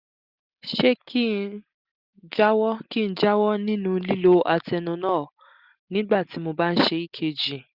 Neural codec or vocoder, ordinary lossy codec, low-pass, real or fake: none; Opus, 64 kbps; 5.4 kHz; real